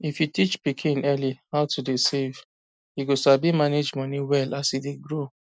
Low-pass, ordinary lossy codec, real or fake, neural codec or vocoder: none; none; real; none